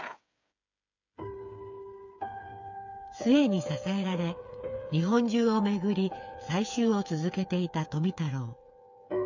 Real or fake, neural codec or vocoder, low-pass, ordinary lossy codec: fake; codec, 16 kHz, 8 kbps, FreqCodec, smaller model; 7.2 kHz; none